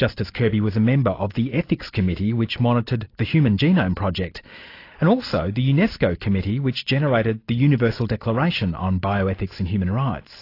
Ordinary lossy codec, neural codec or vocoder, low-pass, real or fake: AAC, 32 kbps; none; 5.4 kHz; real